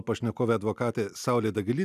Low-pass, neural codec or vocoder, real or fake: 14.4 kHz; none; real